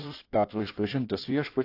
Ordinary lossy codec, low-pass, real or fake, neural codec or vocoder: AAC, 32 kbps; 5.4 kHz; fake; codec, 16 kHz in and 24 kHz out, 1.1 kbps, FireRedTTS-2 codec